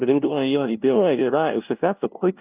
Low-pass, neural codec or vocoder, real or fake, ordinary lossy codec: 3.6 kHz; codec, 16 kHz, 0.5 kbps, FunCodec, trained on LibriTTS, 25 frames a second; fake; Opus, 24 kbps